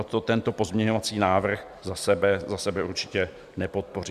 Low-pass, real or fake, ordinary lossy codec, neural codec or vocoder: 14.4 kHz; real; Opus, 64 kbps; none